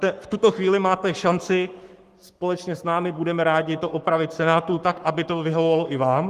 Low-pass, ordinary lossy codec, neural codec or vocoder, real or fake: 14.4 kHz; Opus, 24 kbps; codec, 44.1 kHz, 7.8 kbps, Pupu-Codec; fake